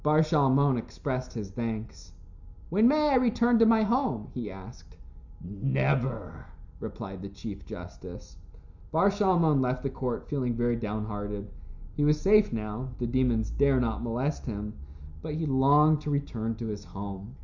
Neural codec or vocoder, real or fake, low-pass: none; real; 7.2 kHz